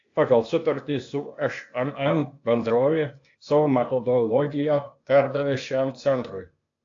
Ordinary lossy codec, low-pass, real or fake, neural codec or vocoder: AAC, 48 kbps; 7.2 kHz; fake; codec, 16 kHz, 0.8 kbps, ZipCodec